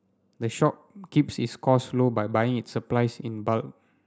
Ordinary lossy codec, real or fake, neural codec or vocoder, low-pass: none; real; none; none